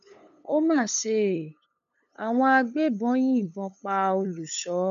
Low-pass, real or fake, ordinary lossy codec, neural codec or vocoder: 7.2 kHz; fake; none; codec, 16 kHz, 8 kbps, FunCodec, trained on LibriTTS, 25 frames a second